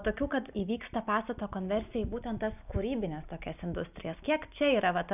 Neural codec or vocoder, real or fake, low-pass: none; real; 3.6 kHz